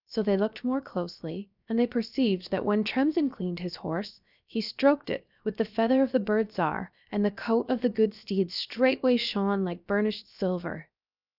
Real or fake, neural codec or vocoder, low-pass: fake; codec, 16 kHz, 0.7 kbps, FocalCodec; 5.4 kHz